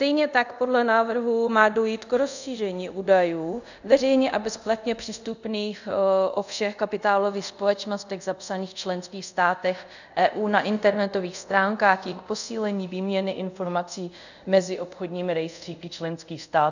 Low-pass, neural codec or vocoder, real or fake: 7.2 kHz; codec, 24 kHz, 0.5 kbps, DualCodec; fake